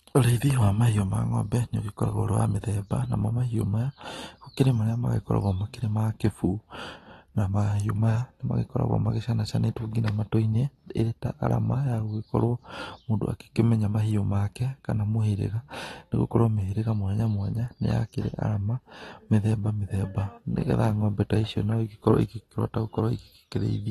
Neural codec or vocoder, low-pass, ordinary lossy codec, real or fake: none; 19.8 kHz; AAC, 32 kbps; real